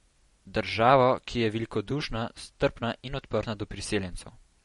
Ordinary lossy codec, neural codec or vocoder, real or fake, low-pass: MP3, 48 kbps; none; real; 19.8 kHz